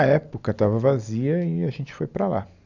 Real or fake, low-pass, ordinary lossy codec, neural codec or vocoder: real; 7.2 kHz; none; none